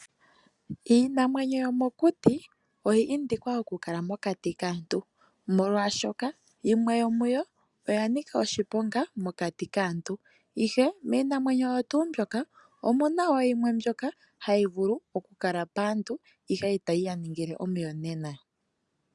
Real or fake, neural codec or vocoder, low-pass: real; none; 10.8 kHz